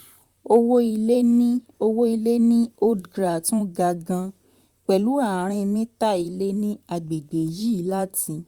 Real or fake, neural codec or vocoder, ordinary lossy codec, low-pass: fake; vocoder, 44.1 kHz, 128 mel bands, Pupu-Vocoder; Opus, 64 kbps; 19.8 kHz